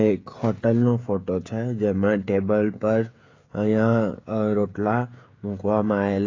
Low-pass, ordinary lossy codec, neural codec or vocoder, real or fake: 7.2 kHz; AAC, 32 kbps; codec, 16 kHz, 16 kbps, FreqCodec, smaller model; fake